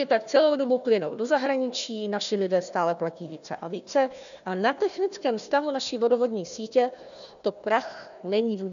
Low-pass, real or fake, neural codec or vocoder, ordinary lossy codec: 7.2 kHz; fake; codec, 16 kHz, 1 kbps, FunCodec, trained on Chinese and English, 50 frames a second; MP3, 96 kbps